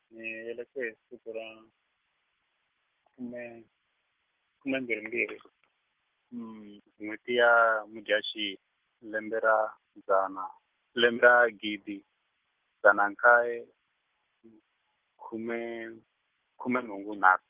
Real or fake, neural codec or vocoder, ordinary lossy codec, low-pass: real; none; Opus, 16 kbps; 3.6 kHz